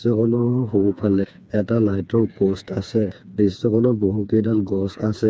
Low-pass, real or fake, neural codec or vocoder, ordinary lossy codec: none; fake; codec, 16 kHz, 4 kbps, FreqCodec, smaller model; none